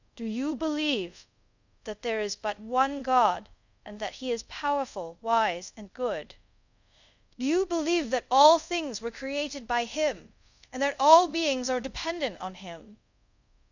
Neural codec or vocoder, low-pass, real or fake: codec, 24 kHz, 0.5 kbps, DualCodec; 7.2 kHz; fake